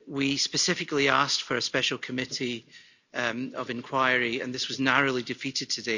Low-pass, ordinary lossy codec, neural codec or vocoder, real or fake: 7.2 kHz; none; none; real